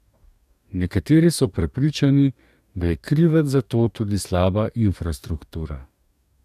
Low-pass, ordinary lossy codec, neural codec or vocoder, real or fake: 14.4 kHz; none; codec, 44.1 kHz, 2.6 kbps, DAC; fake